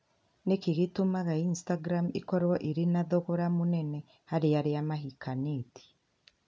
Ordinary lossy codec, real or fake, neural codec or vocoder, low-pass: none; real; none; none